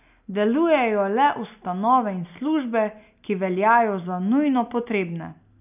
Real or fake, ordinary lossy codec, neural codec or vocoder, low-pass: real; none; none; 3.6 kHz